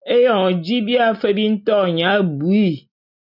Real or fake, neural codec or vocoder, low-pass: real; none; 5.4 kHz